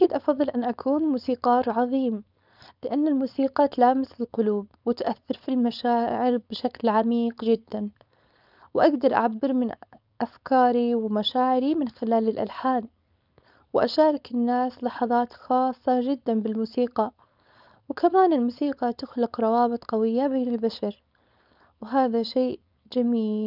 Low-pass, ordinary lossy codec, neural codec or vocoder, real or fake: 5.4 kHz; none; codec, 16 kHz, 4.8 kbps, FACodec; fake